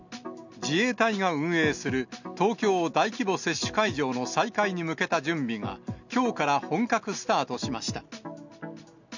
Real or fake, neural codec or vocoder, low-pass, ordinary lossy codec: real; none; 7.2 kHz; none